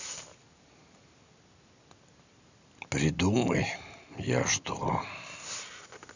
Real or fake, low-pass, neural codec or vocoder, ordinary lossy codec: real; 7.2 kHz; none; none